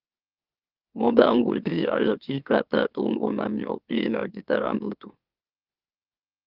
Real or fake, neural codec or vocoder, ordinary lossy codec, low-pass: fake; autoencoder, 44.1 kHz, a latent of 192 numbers a frame, MeloTTS; Opus, 24 kbps; 5.4 kHz